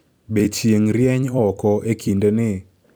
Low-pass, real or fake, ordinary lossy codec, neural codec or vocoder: none; fake; none; vocoder, 44.1 kHz, 128 mel bands every 256 samples, BigVGAN v2